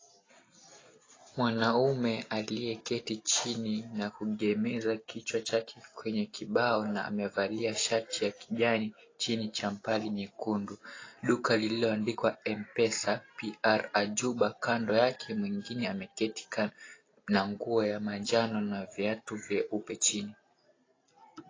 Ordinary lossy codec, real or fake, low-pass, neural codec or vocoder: AAC, 32 kbps; real; 7.2 kHz; none